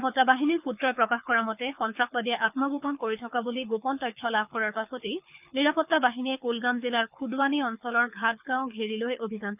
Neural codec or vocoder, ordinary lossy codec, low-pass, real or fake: codec, 24 kHz, 6 kbps, HILCodec; none; 3.6 kHz; fake